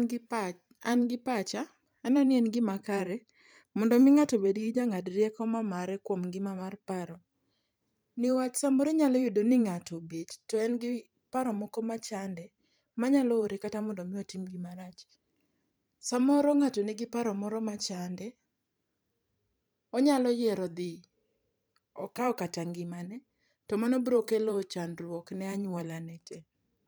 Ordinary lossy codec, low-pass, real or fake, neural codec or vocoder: none; none; fake; vocoder, 44.1 kHz, 128 mel bands every 512 samples, BigVGAN v2